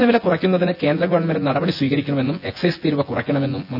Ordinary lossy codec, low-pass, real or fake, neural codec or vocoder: none; 5.4 kHz; fake; vocoder, 24 kHz, 100 mel bands, Vocos